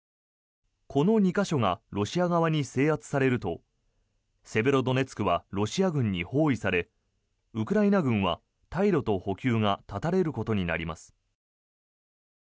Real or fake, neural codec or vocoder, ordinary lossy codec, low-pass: real; none; none; none